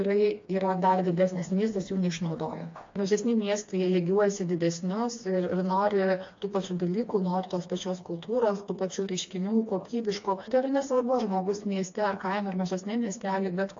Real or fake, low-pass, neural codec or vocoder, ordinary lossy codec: fake; 7.2 kHz; codec, 16 kHz, 2 kbps, FreqCodec, smaller model; AAC, 48 kbps